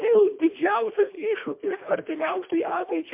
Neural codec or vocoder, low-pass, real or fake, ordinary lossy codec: codec, 24 kHz, 1.5 kbps, HILCodec; 3.6 kHz; fake; MP3, 32 kbps